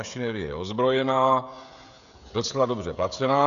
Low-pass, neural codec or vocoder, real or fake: 7.2 kHz; codec, 16 kHz, 16 kbps, FreqCodec, smaller model; fake